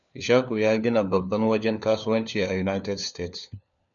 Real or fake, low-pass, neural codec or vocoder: fake; 7.2 kHz; codec, 16 kHz, 4 kbps, FunCodec, trained on LibriTTS, 50 frames a second